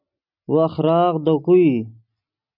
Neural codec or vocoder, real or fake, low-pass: none; real; 5.4 kHz